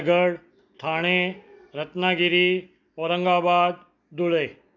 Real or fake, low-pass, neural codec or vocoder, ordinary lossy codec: real; 7.2 kHz; none; none